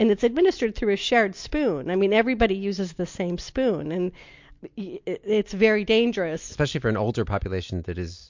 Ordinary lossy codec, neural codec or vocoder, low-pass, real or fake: MP3, 48 kbps; none; 7.2 kHz; real